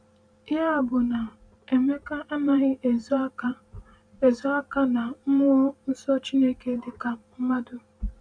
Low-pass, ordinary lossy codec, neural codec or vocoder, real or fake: 9.9 kHz; none; vocoder, 44.1 kHz, 128 mel bands every 512 samples, BigVGAN v2; fake